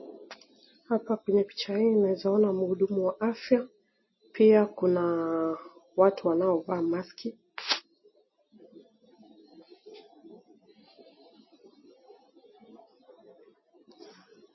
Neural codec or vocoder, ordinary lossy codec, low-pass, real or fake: none; MP3, 24 kbps; 7.2 kHz; real